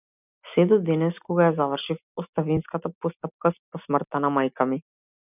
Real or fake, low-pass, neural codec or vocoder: real; 3.6 kHz; none